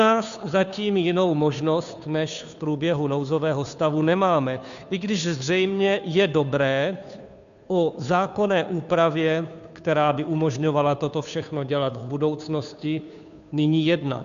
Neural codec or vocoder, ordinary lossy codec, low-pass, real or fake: codec, 16 kHz, 2 kbps, FunCodec, trained on Chinese and English, 25 frames a second; MP3, 96 kbps; 7.2 kHz; fake